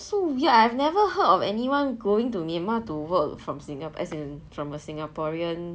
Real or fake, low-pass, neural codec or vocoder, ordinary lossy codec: real; none; none; none